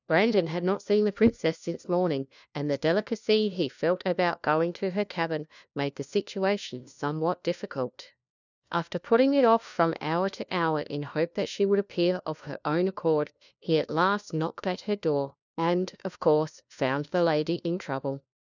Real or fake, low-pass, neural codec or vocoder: fake; 7.2 kHz; codec, 16 kHz, 1 kbps, FunCodec, trained on LibriTTS, 50 frames a second